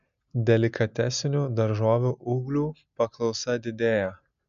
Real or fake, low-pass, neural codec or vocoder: real; 7.2 kHz; none